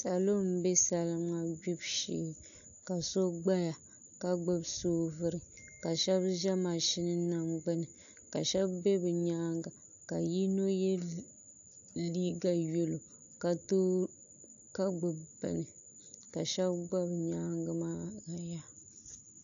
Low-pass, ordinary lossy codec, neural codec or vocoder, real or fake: 7.2 kHz; AAC, 64 kbps; none; real